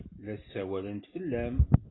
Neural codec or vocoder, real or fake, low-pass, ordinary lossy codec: none; real; 7.2 kHz; AAC, 16 kbps